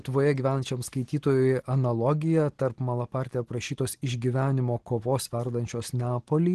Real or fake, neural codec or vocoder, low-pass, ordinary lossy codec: real; none; 10.8 kHz; Opus, 16 kbps